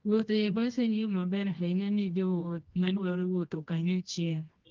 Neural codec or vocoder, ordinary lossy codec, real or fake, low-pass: codec, 24 kHz, 0.9 kbps, WavTokenizer, medium music audio release; Opus, 32 kbps; fake; 7.2 kHz